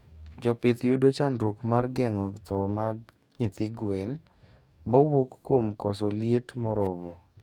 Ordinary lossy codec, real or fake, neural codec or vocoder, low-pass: none; fake; codec, 44.1 kHz, 2.6 kbps, DAC; 19.8 kHz